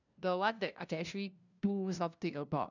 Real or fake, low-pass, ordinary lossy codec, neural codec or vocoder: fake; 7.2 kHz; none; codec, 16 kHz, 0.5 kbps, FunCodec, trained on LibriTTS, 25 frames a second